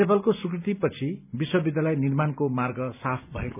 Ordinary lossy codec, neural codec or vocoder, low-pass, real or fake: none; none; 3.6 kHz; real